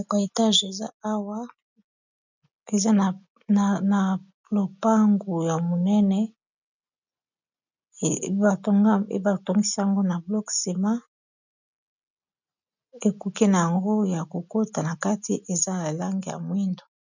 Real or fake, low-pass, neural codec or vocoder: real; 7.2 kHz; none